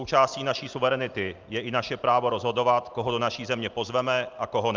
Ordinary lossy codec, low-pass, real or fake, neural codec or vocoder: Opus, 24 kbps; 7.2 kHz; real; none